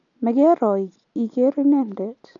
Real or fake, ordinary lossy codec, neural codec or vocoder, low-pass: real; none; none; 7.2 kHz